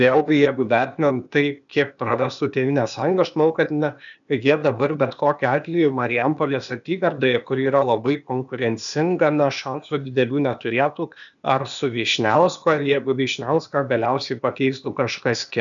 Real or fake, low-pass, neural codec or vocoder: fake; 7.2 kHz; codec, 16 kHz, 0.8 kbps, ZipCodec